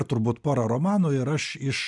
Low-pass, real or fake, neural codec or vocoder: 10.8 kHz; real; none